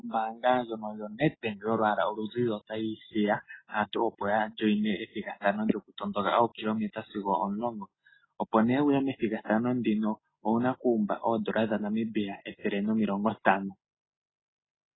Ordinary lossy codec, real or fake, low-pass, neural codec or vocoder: AAC, 16 kbps; real; 7.2 kHz; none